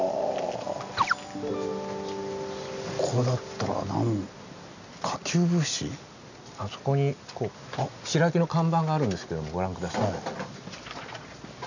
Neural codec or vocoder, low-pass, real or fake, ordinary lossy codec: none; 7.2 kHz; real; none